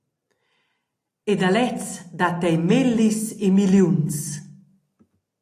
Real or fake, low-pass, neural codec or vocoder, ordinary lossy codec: real; 14.4 kHz; none; AAC, 48 kbps